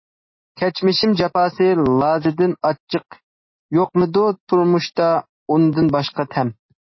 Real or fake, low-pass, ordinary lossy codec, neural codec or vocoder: real; 7.2 kHz; MP3, 24 kbps; none